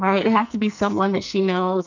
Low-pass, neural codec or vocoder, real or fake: 7.2 kHz; codec, 44.1 kHz, 2.6 kbps, SNAC; fake